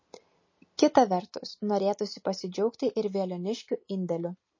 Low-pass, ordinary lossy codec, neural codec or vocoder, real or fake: 7.2 kHz; MP3, 32 kbps; none; real